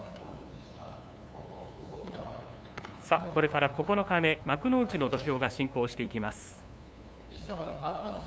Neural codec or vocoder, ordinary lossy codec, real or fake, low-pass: codec, 16 kHz, 2 kbps, FunCodec, trained on LibriTTS, 25 frames a second; none; fake; none